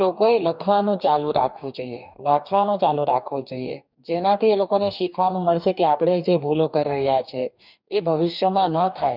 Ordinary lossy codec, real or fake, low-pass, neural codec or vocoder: none; fake; 5.4 kHz; codec, 44.1 kHz, 2.6 kbps, DAC